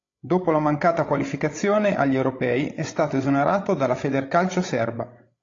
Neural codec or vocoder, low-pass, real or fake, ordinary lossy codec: codec, 16 kHz, 16 kbps, FreqCodec, larger model; 7.2 kHz; fake; AAC, 32 kbps